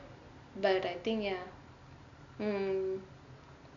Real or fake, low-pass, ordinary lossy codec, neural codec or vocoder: real; 7.2 kHz; AAC, 64 kbps; none